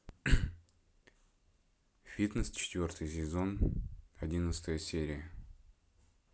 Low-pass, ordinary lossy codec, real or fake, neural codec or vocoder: none; none; real; none